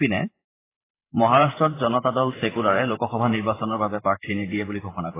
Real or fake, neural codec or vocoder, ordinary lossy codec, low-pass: fake; vocoder, 44.1 kHz, 128 mel bands every 512 samples, BigVGAN v2; AAC, 16 kbps; 3.6 kHz